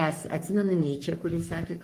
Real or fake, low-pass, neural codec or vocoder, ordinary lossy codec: fake; 14.4 kHz; codec, 44.1 kHz, 3.4 kbps, Pupu-Codec; Opus, 32 kbps